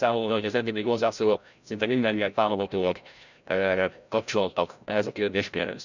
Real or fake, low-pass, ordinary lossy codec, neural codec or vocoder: fake; 7.2 kHz; none; codec, 16 kHz, 0.5 kbps, FreqCodec, larger model